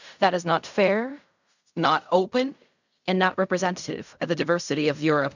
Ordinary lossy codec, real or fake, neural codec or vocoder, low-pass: none; fake; codec, 16 kHz in and 24 kHz out, 0.4 kbps, LongCat-Audio-Codec, fine tuned four codebook decoder; 7.2 kHz